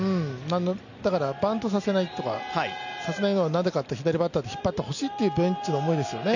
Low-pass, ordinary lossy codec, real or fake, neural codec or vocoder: 7.2 kHz; none; real; none